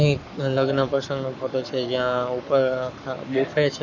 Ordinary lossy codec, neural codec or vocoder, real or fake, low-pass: none; codec, 44.1 kHz, 7.8 kbps, Pupu-Codec; fake; 7.2 kHz